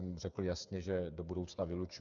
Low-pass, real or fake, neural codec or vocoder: 7.2 kHz; fake; codec, 16 kHz, 8 kbps, FreqCodec, smaller model